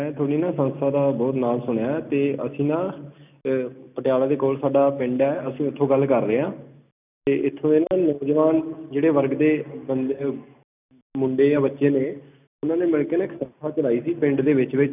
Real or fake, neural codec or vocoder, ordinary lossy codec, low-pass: real; none; none; 3.6 kHz